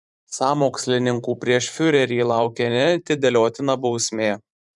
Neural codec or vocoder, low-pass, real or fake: vocoder, 44.1 kHz, 128 mel bands every 512 samples, BigVGAN v2; 10.8 kHz; fake